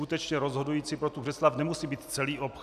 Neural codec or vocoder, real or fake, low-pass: none; real; 14.4 kHz